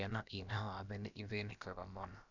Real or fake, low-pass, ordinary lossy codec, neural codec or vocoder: fake; 7.2 kHz; none; codec, 16 kHz, about 1 kbps, DyCAST, with the encoder's durations